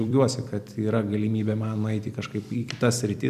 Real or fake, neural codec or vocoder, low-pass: fake; vocoder, 44.1 kHz, 128 mel bands every 256 samples, BigVGAN v2; 14.4 kHz